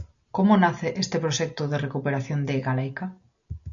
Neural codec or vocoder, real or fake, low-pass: none; real; 7.2 kHz